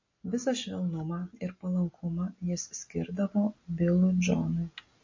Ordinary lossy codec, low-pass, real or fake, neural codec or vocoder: MP3, 32 kbps; 7.2 kHz; real; none